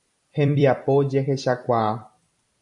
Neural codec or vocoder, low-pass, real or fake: vocoder, 44.1 kHz, 128 mel bands every 256 samples, BigVGAN v2; 10.8 kHz; fake